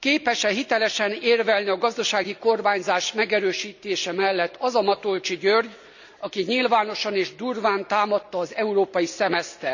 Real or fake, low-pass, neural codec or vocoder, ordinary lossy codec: real; 7.2 kHz; none; none